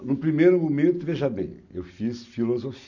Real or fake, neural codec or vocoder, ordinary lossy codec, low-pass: real; none; none; 7.2 kHz